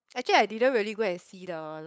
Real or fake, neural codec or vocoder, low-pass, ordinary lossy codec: fake; codec, 16 kHz, 8 kbps, FunCodec, trained on LibriTTS, 25 frames a second; none; none